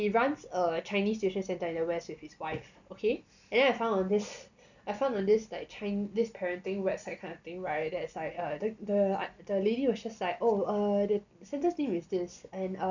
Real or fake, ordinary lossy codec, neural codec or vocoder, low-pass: real; none; none; 7.2 kHz